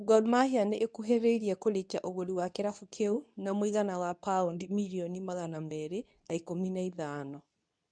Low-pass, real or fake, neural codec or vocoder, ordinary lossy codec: 9.9 kHz; fake; codec, 24 kHz, 0.9 kbps, WavTokenizer, medium speech release version 2; none